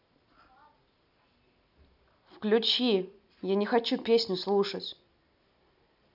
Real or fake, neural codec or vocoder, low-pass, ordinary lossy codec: real; none; 5.4 kHz; none